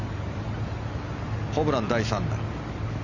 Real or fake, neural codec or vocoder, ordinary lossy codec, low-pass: real; none; none; 7.2 kHz